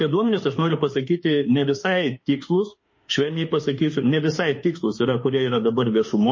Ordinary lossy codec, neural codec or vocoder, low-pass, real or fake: MP3, 32 kbps; autoencoder, 48 kHz, 32 numbers a frame, DAC-VAE, trained on Japanese speech; 7.2 kHz; fake